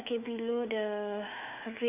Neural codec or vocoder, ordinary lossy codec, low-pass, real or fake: autoencoder, 48 kHz, 128 numbers a frame, DAC-VAE, trained on Japanese speech; none; 3.6 kHz; fake